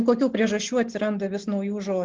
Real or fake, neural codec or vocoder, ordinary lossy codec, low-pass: real; none; Opus, 16 kbps; 7.2 kHz